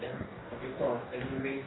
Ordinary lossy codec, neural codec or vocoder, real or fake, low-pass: AAC, 16 kbps; codec, 44.1 kHz, 2.6 kbps, DAC; fake; 7.2 kHz